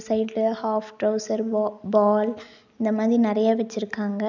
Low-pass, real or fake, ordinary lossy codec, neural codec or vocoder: 7.2 kHz; real; none; none